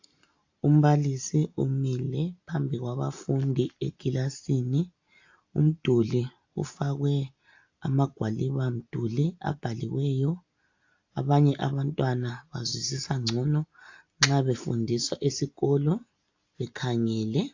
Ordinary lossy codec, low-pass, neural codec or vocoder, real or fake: AAC, 48 kbps; 7.2 kHz; none; real